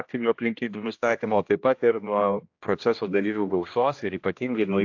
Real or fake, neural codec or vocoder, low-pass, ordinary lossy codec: fake; codec, 16 kHz, 1 kbps, X-Codec, HuBERT features, trained on general audio; 7.2 kHz; AAC, 48 kbps